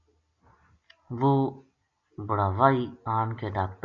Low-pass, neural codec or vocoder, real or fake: 7.2 kHz; none; real